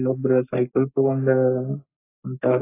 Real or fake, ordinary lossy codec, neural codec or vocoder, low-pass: fake; AAC, 16 kbps; codec, 44.1 kHz, 3.4 kbps, Pupu-Codec; 3.6 kHz